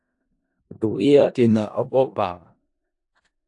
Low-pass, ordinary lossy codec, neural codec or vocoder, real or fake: 10.8 kHz; AAC, 48 kbps; codec, 16 kHz in and 24 kHz out, 0.4 kbps, LongCat-Audio-Codec, four codebook decoder; fake